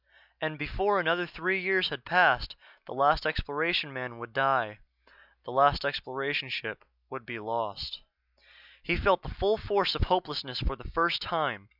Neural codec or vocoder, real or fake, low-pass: none; real; 5.4 kHz